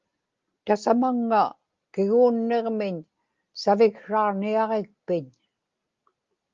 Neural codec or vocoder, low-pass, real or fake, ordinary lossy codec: none; 7.2 kHz; real; Opus, 32 kbps